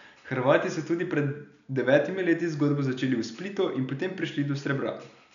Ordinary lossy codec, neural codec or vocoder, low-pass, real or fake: none; none; 7.2 kHz; real